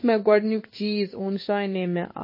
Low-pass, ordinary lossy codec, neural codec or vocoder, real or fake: 5.4 kHz; MP3, 24 kbps; codec, 16 kHz in and 24 kHz out, 1 kbps, XY-Tokenizer; fake